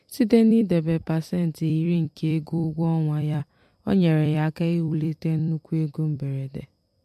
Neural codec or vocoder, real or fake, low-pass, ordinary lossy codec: vocoder, 44.1 kHz, 128 mel bands every 256 samples, BigVGAN v2; fake; 14.4 kHz; MP3, 64 kbps